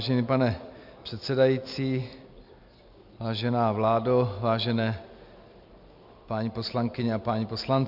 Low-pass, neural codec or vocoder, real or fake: 5.4 kHz; none; real